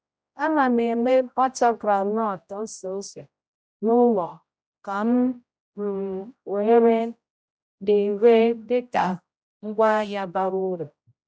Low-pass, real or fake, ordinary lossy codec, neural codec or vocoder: none; fake; none; codec, 16 kHz, 0.5 kbps, X-Codec, HuBERT features, trained on general audio